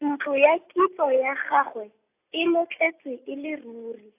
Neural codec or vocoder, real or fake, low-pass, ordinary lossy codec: none; real; 3.6 kHz; AAC, 24 kbps